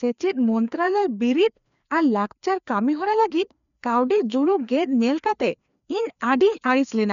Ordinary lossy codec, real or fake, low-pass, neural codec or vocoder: MP3, 96 kbps; fake; 7.2 kHz; codec, 16 kHz, 2 kbps, FreqCodec, larger model